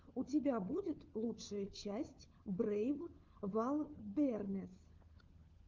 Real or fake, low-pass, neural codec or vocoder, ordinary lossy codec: fake; 7.2 kHz; codec, 16 kHz, 4 kbps, FunCodec, trained on LibriTTS, 50 frames a second; Opus, 32 kbps